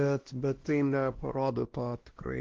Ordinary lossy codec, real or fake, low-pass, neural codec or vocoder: Opus, 16 kbps; fake; 7.2 kHz; codec, 16 kHz, 1 kbps, X-Codec, WavLM features, trained on Multilingual LibriSpeech